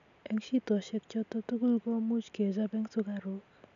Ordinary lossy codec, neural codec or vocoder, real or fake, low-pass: none; none; real; 7.2 kHz